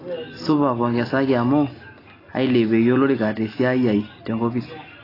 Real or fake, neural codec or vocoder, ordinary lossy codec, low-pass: real; none; AAC, 24 kbps; 5.4 kHz